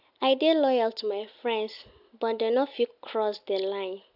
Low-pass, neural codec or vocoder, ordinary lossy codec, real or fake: 5.4 kHz; none; AAC, 48 kbps; real